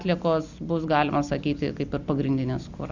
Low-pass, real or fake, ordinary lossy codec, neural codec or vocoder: 7.2 kHz; real; Opus, 64 kbps; none